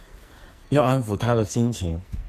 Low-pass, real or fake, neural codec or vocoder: 14.4 kHz; fake; codec, 44.1 kHz, 2.6 kbps, SNAC